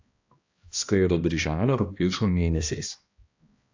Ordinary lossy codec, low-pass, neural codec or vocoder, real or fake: AAC, 48 kbps; 7.2 kHz; codec, 16 kHz, 1 kbps, X-Codec, HuBERT features, trained on balanced general audio; fake